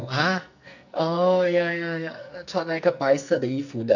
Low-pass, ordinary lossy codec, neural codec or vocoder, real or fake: 7.2 kHz; none; codec, 44.1 kHz, 2.6 kbps, SNAC; fake